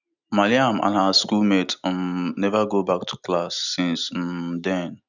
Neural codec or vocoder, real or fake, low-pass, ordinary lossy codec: none; real; 7.2 kHz; none